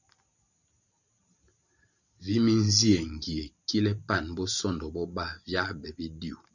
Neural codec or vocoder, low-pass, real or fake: vocoder, 44.1 kHz, 128 mel bands every 512 samples, BigVGAN v2; 7.2 kHz; fake